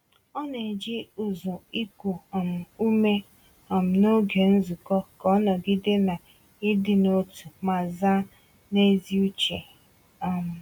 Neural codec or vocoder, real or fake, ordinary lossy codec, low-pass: none; real; none; 19.8 kHz